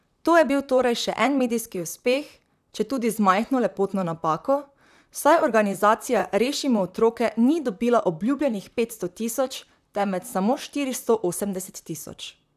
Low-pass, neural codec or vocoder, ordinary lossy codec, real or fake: 14.4 kHz; vocoder, 44.1 kHz, 128 mel bands, Pupu-Vocoder; none; fake